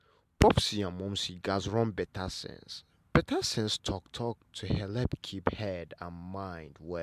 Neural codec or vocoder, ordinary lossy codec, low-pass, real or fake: none; MP3, 96 kbps; 14.4 kHz; real